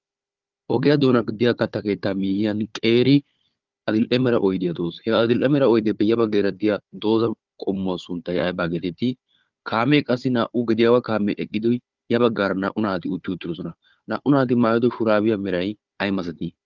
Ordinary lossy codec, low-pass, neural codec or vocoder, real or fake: Opus, 32 kbps; 7.2 kHz; codec, 16 kHz, 4 kbps, FunCodec, trained on Chinese and English, 50 frames a second; fake